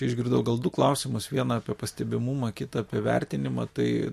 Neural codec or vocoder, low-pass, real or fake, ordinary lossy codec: none; 14.4 kHz; real; MP3, 64 kbps